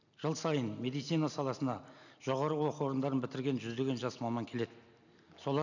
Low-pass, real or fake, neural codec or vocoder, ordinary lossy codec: 7.2 kHz; real; none; none